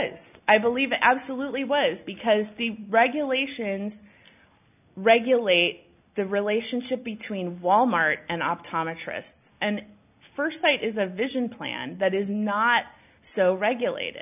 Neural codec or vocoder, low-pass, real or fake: none; 3.6 kHz; real